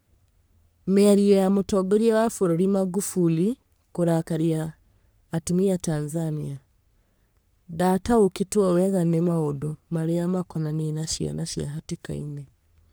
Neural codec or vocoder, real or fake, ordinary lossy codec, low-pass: codec, 44.1 kHz, 3.4 kbps, Pupu-Codec; fake; none; none